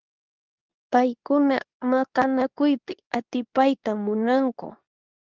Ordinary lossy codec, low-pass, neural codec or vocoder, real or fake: Opus, 32 kbps; 7.2 kHz; codec, 24 kHz, 0.9 kbps, WavTokenizer, medium speech release version 2; fake